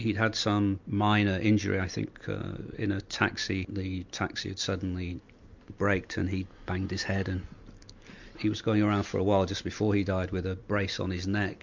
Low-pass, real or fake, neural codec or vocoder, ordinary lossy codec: 7.2 kHz; real; none; MP3, 64 kbps